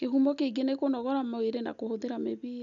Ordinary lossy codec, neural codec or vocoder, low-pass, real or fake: none; none; 7.2 kHz; real